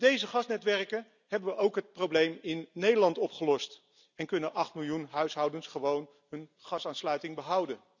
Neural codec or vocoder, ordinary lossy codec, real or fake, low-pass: none; none; real; 7.2 kHz